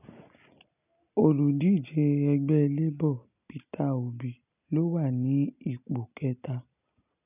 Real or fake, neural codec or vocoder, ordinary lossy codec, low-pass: real; none; none; 3.6 kHz